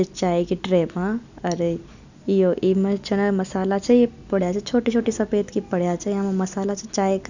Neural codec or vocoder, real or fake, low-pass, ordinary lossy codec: none; real; 7.2 kHz; none